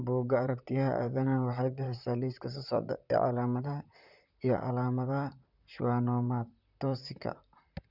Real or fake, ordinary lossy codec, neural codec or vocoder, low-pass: fake; none; vocoder, 44.1 kHz, 128 mel bands, Pupu-Vocoder; 5.4 kHz